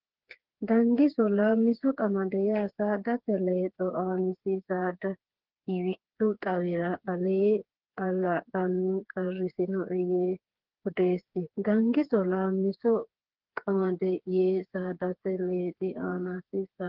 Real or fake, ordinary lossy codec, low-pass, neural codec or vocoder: fake; Opus, 16 kbps; 5.4 kHz; codec, 16 kHz, 4 kbps, FreqCodec, smaller model